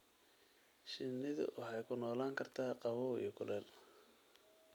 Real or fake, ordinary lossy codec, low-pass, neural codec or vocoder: real; none; none; none